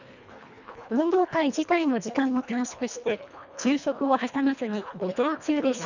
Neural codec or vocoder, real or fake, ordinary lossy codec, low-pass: codec, 24 kHz, 1.5 kbps, HILCodec; fake; none; 7.2 kHz